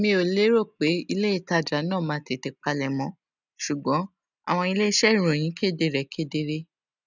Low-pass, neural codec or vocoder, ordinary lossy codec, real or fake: 7.2 kHz; none; none; real